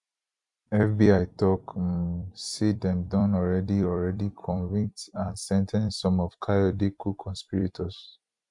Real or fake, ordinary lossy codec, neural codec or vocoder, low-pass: fake; none; vocoder, 44.1 kHz, 128 mel bands every 256 samples, BigVGAN v2; 10.8 kHz